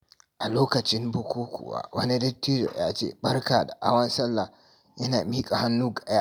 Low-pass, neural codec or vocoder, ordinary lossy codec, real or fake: none; none; none; real